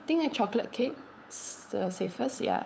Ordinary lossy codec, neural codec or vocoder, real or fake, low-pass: none; codec, 16 kHz, 8 kbps, FunCodec, trained on LibriTTS, 25 frames a second; fake; none